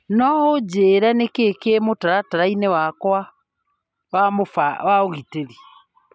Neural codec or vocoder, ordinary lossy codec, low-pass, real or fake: none; none; none; real